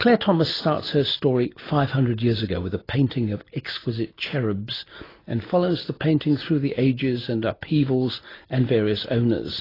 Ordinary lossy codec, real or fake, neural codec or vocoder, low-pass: AAC, 24 kbps; real; none; 5.4 kHz